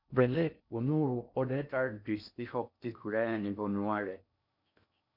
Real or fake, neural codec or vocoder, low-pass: fake; codec, 16 kHz in and 24 kHz out, 0.6 kbps, FocalCodec, streaming, 2048 codes; 5.4 kHz